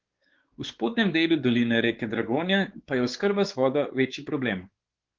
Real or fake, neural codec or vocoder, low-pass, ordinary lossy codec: fake; codec, 16 kHz, 4 kbps, X-Codec, WavLM features, trained on Multilingual LibriSpeech; 7.2 kHz; Opus, 16 kbps